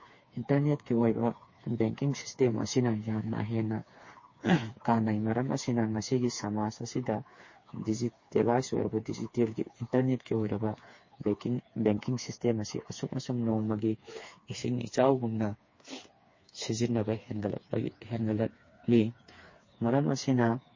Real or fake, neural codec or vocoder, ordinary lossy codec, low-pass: fake; codec, 16 kHz, 4 kbps, FreqCodec, smaller model; MP3, 32 kbps; 7.2 kHz